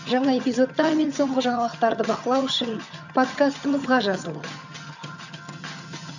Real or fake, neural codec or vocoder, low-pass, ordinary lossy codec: fake; vocoder, 22.05 kHz, 80 mel bands, HiFi-GAN; 7.2 kHz; none